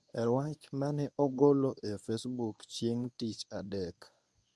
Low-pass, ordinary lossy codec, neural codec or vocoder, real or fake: none; none; codec, 24 kHz, 0.9 kbps, WavTokenizer, medium speech release version 1; fake